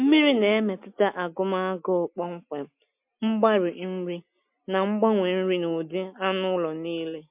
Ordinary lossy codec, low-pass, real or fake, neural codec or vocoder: MP3, 32 kbps; 3.6 kHz; real; none